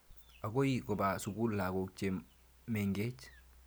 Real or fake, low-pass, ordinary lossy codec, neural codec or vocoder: real; none; none; none